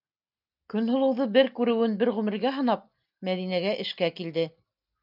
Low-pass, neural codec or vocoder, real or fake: 5.4 kHz; none; real